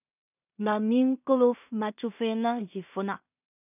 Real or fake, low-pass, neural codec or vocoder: fake; 3.6 kHz; codec, 16 kHz in and 24 kHz out, 0.4 kbps, LongCat-Audio-Codec, two codebook decoder